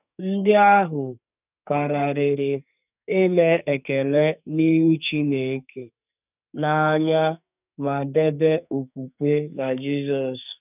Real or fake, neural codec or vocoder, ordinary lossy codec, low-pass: fake; codec, 44.1 kHz, 2.6 kbps, SNAC; none; 3.6 kHz